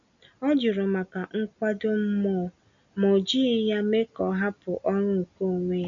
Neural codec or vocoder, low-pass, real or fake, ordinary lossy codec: none; 7.2 kHz; real; none